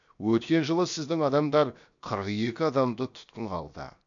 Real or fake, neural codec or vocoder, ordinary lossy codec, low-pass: fake; codec, 16 kHz, 0.7 kbps, FocalCodec; AAC, 64 kbps; 7.2 kHz